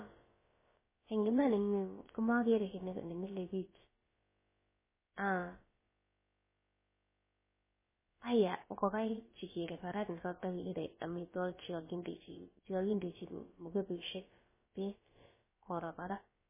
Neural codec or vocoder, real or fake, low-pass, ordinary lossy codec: codec, 16 kHz, about 1 kbps, DyCAST, with the encoder's durations; fake; 3.6 kHz; MP3, 16 kbps